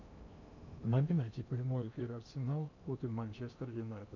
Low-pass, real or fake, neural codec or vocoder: 7.2 kHz; fake; codec, 16 kHz in and 24 kHz out, 0.8 kbps, FocalCodec, streaming, 65536 codes